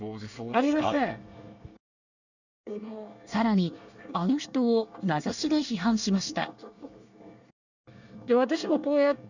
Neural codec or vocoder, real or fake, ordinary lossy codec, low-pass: codec, 24 kHz, 1 kbps, SNAC; fake; none; 7.2 kHz